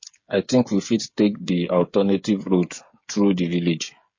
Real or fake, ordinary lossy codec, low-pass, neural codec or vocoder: fake; MP3, 32 kbps; 7.2 kHz; codec, 16 kHz, 8 kbps, FreqCodec, smaller model